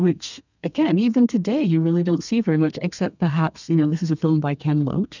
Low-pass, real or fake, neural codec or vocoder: 7.2 kHz; fake; codec, 32 kHz, 1.9 kbps, SNAC